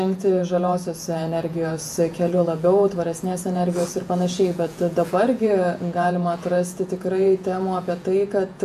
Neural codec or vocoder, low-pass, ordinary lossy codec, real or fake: vocoder, 48 kHz, 128 mel bands, Vocos; 14.4 kHz; AAC, 96 kbps; fake